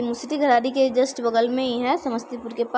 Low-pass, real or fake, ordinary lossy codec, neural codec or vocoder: none; real; none; none